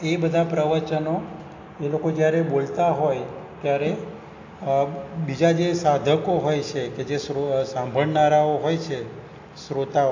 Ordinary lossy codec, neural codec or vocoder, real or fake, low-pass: AAC, 48 kbps; none; real; 7.2 kHz